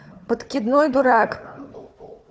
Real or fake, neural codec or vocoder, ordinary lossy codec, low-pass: fake; codec, 16 kHz, 4 kbps, FunCodec, trained on Chinese and English, 50 frames a second; none; none